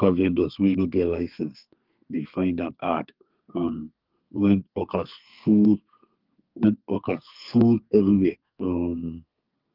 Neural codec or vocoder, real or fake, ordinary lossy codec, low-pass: codec, 32 kHz, 1.9 kbps, SNAC; fake; Opus, 24 kbps; 5.4 kHz